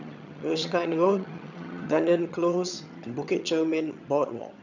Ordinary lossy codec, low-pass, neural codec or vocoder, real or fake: none; 7.2 kHz; vocoder, 22.05 kHz, 80 mel bands, HiFi-GAN; fake